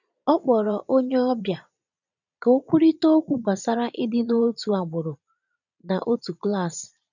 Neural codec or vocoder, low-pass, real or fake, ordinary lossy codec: vocoder, 22.05 kHz, 80 mel bands, Vocos; 7.2 kHz; fake; none